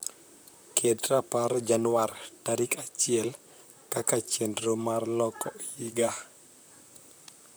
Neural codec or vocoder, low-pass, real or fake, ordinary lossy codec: vocoder, 44.1 kHz, 128 mel bands, Pupu-Vocoder; none; fake; none